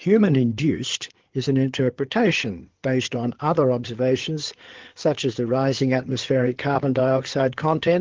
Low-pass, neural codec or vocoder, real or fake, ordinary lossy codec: 7.2 kHz; codec, 16 kHz in and 24 kHz out, 2.2 kbps, FireRedTTS-2 codec; fake; Opus, 16 kbps